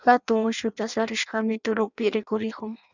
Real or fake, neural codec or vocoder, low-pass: fake; codec, 16 kHz in and 24 kHz out, 0.6 kbps, FireRedTTS-2 codec; 7.2 kHz